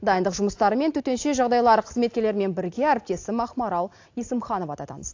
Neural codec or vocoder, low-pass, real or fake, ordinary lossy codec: none; 7.2 kHz; real; AAC, 48 kbps